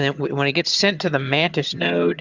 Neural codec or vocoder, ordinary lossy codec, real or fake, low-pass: vocoder, 22.05 kHz, 80 mel bands, HiFi-GAN; Opus, 64 kbps; fake; 7.2 kHz